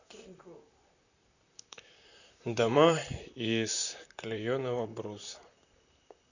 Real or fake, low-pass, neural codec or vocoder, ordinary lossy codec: fake; 7.2 kHz; vocoder, 44.1 kHz, 128 mel bands, Pupu-Vocoder; none